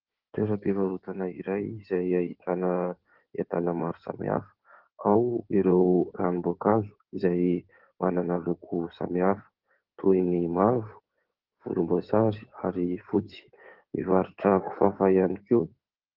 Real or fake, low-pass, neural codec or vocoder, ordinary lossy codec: fake; 5.4 kHz; codec, 16 kHz in and 24 kHz out, 2.2 kbps, FireRedTTS-2 codec; Opus, 16 kbps